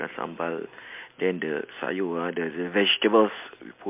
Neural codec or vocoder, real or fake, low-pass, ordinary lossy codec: none; real; 3.6 kHz; MP3, 32 kbps